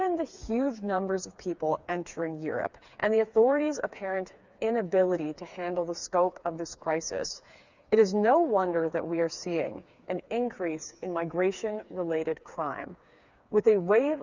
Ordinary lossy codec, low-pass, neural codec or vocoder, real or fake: Opus, 64 kbps; 7.2 kHz; codec, 16 kHz, 4 kbps, FreqCodec, smaller model; fake